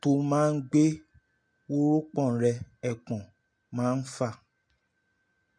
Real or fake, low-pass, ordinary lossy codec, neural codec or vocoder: real; 9.9 kHz; MP3, 48 kbps; none